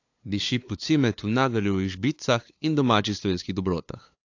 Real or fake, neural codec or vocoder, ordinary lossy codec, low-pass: fake; codec, 16 kHz, 2 kbps, FunCodec, trained on LibriTTS, 25 frames a second; AAC, 48 kbps; 7.2 kHz